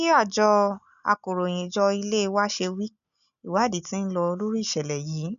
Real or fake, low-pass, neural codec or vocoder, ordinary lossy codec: real; 7.2 kHz; none; none